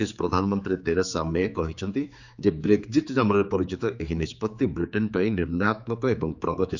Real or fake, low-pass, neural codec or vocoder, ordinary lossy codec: fake; 7.2 kHz; codec, 16 kHz, 4 kbps, X-Codec, HuBERT features, trained on general audio; none